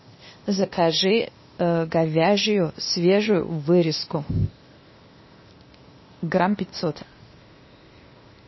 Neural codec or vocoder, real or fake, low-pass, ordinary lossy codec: codec, 16 kHz, 0.8 kbps, ZipCodec; fake; 7.2 kHz; MP3, 24 kbps